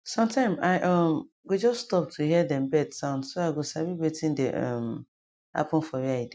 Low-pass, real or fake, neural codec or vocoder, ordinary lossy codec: none; real; none; none